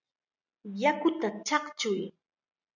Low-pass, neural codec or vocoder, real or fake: 7.2 kHz; none; real